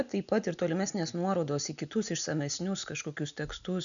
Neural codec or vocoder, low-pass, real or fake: none; 7.2 kHz; real